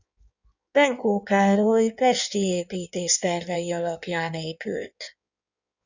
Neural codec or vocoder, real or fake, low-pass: codec, 16 kHz in and 24 kHz out, 1.1 kbps, FireRedTTS-2 codec; fake; 7.2 kHz